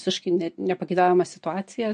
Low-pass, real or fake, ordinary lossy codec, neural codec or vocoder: 9.9 kHz; real; MP3, 48 kbps; none